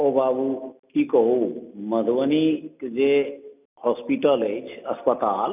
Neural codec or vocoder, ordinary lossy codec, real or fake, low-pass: none; none; real; 3.6 kHz